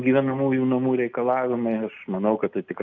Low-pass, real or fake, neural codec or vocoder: 7.2 kHz; real; none